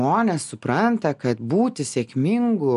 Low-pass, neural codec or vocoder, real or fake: 10.8 kHz; none; real